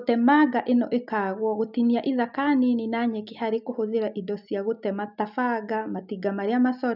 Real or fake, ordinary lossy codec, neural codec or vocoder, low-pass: real; none; none; 5.4 kHz